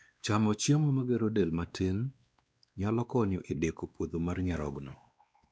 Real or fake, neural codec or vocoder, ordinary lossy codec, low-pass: fake; codec, 16 kHz, 2 kbps, X-Codec, WavLM features, trained on Multilingual LibriSpeech; none; none